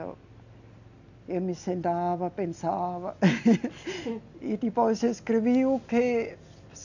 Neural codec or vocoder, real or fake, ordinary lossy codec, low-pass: none; real; none; 7.2 kHz